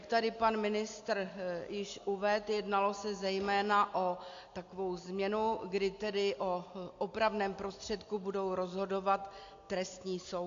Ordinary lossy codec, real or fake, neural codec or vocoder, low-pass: AAC, 48 kbps; real; none; 7.2 kHz